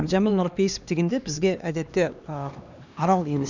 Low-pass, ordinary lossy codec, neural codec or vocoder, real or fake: 7.2 kHz; none; codec, 16 kHz, 2 kbps, X-Codec, HuBERT features, trained on LibriSpeech; fake